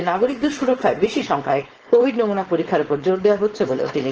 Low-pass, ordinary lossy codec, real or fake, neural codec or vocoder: 7.2 kHz; Opus, 16 kbps; fake; codec, 16 kHz, 4.8 kbps, FACodec